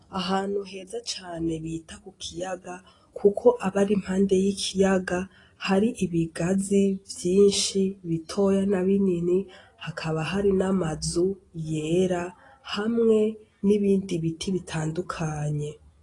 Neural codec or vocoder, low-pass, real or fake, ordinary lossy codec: none; 10.8 kHz; real; AAC, 32 kbps